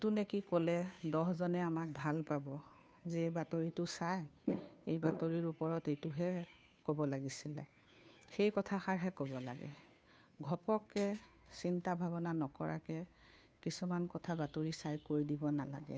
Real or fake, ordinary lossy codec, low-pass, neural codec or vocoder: fake; none; none; codec, 16 kHz, 2 kbps, FunCodec, trained on Chinese and English, 25 frames a second